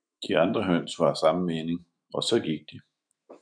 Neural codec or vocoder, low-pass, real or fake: autoencoder, 48 kHz, 128 numbers a frame, DAC-VAE, trained on Japanese speech; 9.9 kHz; fake